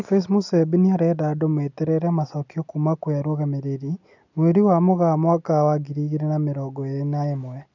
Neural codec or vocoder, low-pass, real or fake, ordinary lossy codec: none; 7.2 kHz; real; none